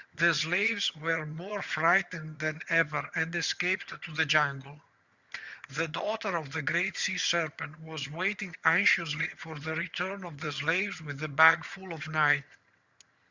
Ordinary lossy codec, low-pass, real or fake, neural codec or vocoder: Opus, 64 kbps; 7.2 kHz; fake; vocoder, 22.05 kHz, 80 mel bands, HiFi-GAN